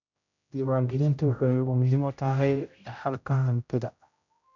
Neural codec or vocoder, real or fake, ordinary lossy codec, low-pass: codec, 16 kHz, 0.5 kbps, X-Codec, HuBERT features, trained on general audio; fake; none; 7.2 kHz